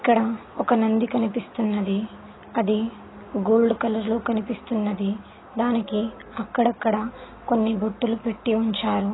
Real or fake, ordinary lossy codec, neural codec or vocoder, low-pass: real; AAC, 16 kbps; none; 7.2 kHz